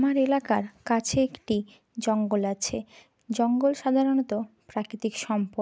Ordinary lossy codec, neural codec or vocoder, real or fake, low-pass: none; none; real; none